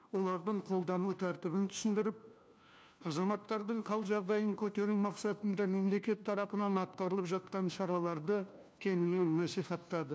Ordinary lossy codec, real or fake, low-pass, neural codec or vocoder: none; fake; none; codec, 16 kHz, 1 kbps, FunCodec, trained on LibriTTS, 50 frames a second